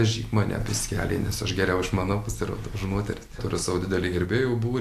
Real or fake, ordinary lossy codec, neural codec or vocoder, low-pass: real; AAC, 64 kbps; none; 14.4 kHz